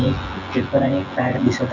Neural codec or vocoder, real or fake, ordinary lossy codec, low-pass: vocoder, 24 kHz, 100 mel bands, Vocos; fake; none; 7.2 kHz